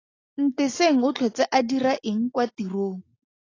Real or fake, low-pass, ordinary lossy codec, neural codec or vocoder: real; 7.2 kHz; AAC, 32 kbps; none